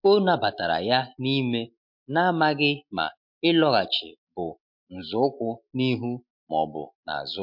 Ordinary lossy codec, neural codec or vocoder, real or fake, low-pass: MP3, 48 kbps; none; real; 5.4 kHz